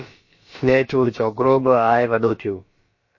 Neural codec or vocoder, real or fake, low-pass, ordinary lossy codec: codec, 16 kHz, about 1 kbps, DyCAST, with the encoder's durations; fake; 7.2 kHz; MP3, 32 kbps